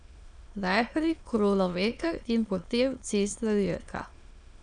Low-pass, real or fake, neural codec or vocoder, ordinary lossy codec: 9.9 kHz; fake; autoencoder, 22.05 kHz, a latent of 192 numbers a frame, VITS, trained on many speakers; none